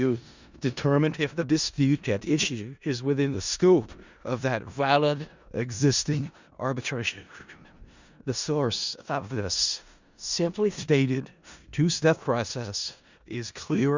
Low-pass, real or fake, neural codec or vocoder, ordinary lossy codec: 7.2 kHz; fake; codec, 16 kHz in and 24 kHz out, 0.4 kbps, LongCat-Audio-Codec, four codebook decoder; Opus, 64 kbps